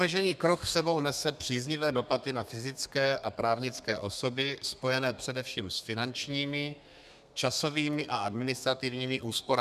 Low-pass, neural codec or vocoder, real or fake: 14.4 kHz; codec, 32 kHz, 1.9 kbps, SNAC; fake